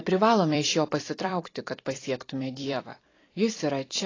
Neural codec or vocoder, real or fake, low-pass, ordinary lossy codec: vocoder, 44.1 kHz, 128 mel bands every 256 samples, BigVGAN v2; fake; 7.2 kHz; AAC, 32 kbps